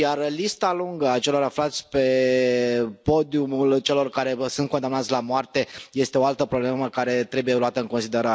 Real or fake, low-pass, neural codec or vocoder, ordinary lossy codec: real; none; none; none